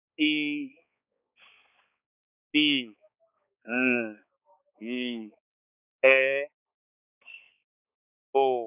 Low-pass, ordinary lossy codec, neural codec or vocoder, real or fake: 3.6 kHz; none; codec, 16 kHz, 4 kbps, X-Codec, HuBERT features, trained on balanced general audio; fake